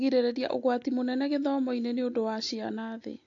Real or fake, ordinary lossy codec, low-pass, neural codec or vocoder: real; AAC, 48 kbps; 7.2 kHz; none